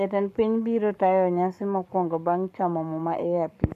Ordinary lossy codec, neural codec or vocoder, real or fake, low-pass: none; codec, 44.1 kHz, 7.8 kbps, Pupu-Codec; fake; 14.4 kHz